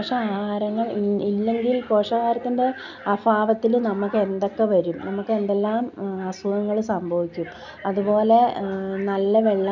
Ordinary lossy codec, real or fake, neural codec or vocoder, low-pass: none; real; none; 7.2 kHz